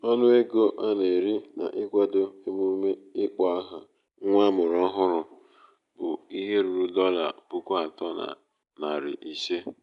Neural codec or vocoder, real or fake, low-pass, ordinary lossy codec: none; real; 10.8 kHz; none